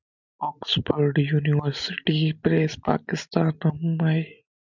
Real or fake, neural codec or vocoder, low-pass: real; none; 7.2 kHz